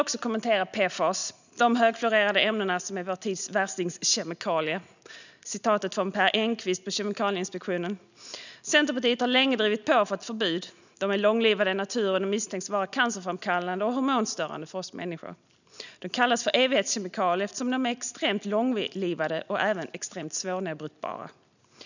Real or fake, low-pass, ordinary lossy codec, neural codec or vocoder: real; 7.2 kHz; none; none